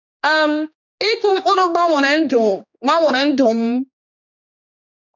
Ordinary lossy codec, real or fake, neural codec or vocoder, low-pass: none; fake; codec, 16 kHz, 2 kbps, X-Codec, HuBERT features, trained on general audio; 7.2 kHz